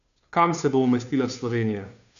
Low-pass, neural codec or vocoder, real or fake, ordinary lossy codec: 7.2 kHz; codec, 16 kHz, 2 kbps, FunCodec, trained on Chinese and English, 25 frames a second; fake; none